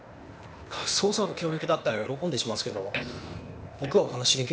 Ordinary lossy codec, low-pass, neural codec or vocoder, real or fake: none; none; codec, 16 kHz, 0.8 kbps, ZipCodec; fake